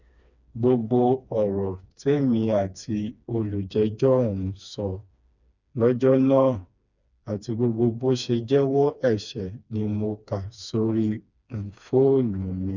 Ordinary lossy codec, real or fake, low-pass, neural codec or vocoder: none; fake; 7.2 kHz; codec, 16 kHz, 2 kbps, FreqCodec, smaller model